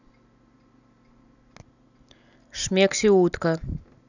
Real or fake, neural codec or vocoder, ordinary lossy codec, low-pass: real; none; none; 7.2 kHz